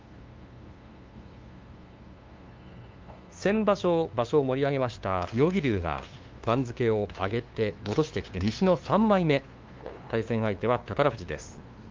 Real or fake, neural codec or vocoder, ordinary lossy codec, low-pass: fake; codec, 16 kHz, 2 kbps, FunCodec, trained on LibriTTS, 25 frames a second; Opus, 32 kbps; 7.2 kHz